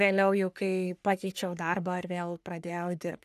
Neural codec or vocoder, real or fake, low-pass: codec, 44.1 kHz, 3.4 kbps, Pupu-Codec; fake; 14.4 kHz